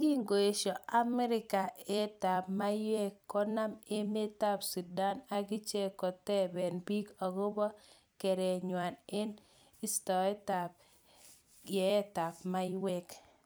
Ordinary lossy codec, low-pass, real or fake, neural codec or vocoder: none; none; fake; vocoder, 44.1 kHz, 128 mel bands every 256 samples, BigVGAN v2